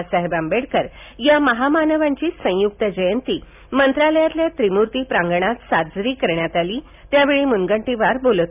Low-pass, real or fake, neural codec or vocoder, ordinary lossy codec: 3.6 kHz; real; none; none